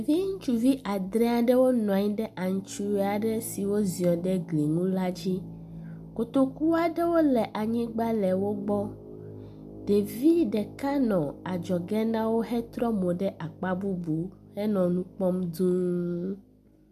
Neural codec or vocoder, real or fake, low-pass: none; real; 14.4 kHz